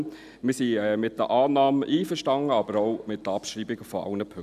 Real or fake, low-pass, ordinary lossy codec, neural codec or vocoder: real; 14.4 kHz; none; none